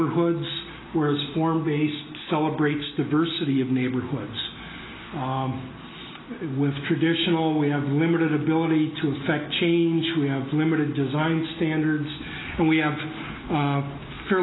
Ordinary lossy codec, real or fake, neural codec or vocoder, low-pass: AAC, 16 kbps; real; none; 7.2 kHz